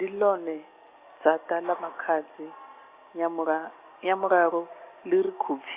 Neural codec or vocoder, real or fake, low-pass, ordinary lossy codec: none; real; 3.6 kHz; Opus, 64 kbps